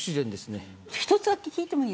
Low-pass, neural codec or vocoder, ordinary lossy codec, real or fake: none; none; none; real